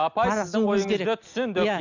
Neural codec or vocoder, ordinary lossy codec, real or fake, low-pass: none; none; real; 7.2 kHz